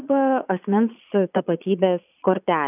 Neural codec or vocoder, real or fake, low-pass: vocoder, 22.05 kHz, 80 mel bands, Vocos; fake; 3.6 kHz